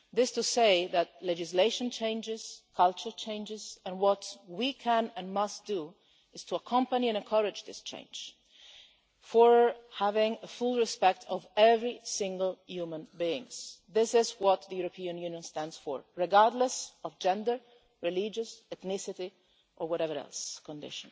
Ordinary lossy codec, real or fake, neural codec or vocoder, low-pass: none; real; none; none